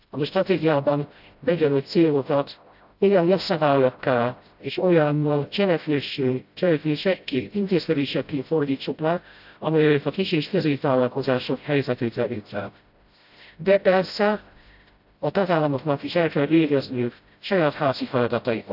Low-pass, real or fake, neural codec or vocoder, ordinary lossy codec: 5.4 kHz; fake; codec, 16 kHz, 0.5 kbps, FreqCodec, smaller model; AAC, 48 kbps